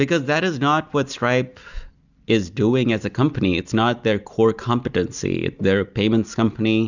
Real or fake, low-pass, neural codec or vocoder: real; 7.2 kHz; none